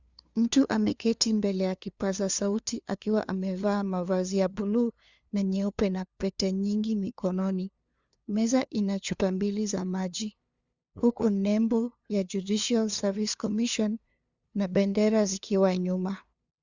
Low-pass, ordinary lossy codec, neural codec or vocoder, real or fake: 7.2 kHz; Opus, 64 kbps; codec, 16 kHz, 2 kbps, FunCodec, trained on LibriTTS, 25 frames a second; fake